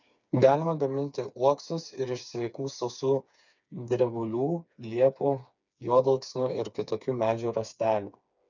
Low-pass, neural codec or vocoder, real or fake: 7.2 kHz; codec, 16 kHz, 4 kbps, FreqCodec, smaller model; fake